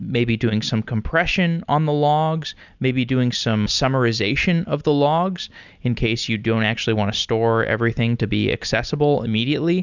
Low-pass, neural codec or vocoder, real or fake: 7.2 kHz; none; real